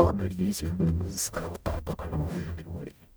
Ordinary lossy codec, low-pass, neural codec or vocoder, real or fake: none; none; codec, 44.1 kHz, 0.9 kbps, DAC; fake